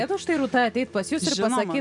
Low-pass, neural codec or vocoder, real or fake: 10.8 kHz; none; real